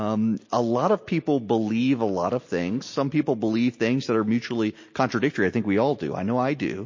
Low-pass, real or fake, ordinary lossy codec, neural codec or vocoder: 7.2 kHz; real; MP3, 32 kbps; none